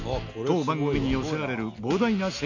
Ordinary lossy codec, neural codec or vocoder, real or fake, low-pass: none; none; real; 7.2 kHz